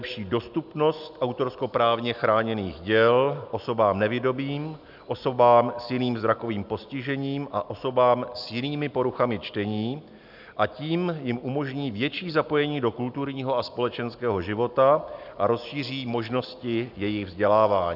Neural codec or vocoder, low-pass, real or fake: none; 5.4 kHz; real